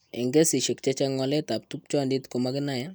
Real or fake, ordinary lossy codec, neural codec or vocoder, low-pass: real; none; none; none